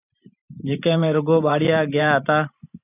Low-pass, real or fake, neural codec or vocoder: 3.6 kHz; fake; vocoder, 44.1 kHz, 128 mel bands every 512 samples, BigVGAN v2